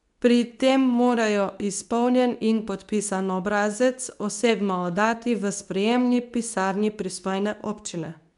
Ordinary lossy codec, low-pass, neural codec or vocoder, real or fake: none; 10.8 kHz; codec, 24 kHz, 0.9 kbps, WavTokenizer, medium speech release version 2; fake